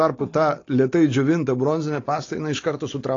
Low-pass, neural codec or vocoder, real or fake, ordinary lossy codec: 7.2 kHz; none; real; AAC, 32 kbps